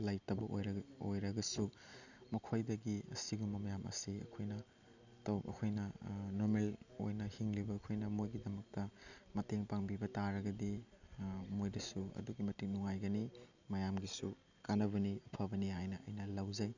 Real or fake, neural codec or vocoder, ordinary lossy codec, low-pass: real; none; none; 7.2 kHz